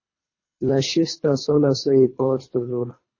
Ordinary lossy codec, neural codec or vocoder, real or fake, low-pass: MP3, 32 kbps; codec, 24 kHz, 3 kbps, HILCodec; fake; 7.2 kHz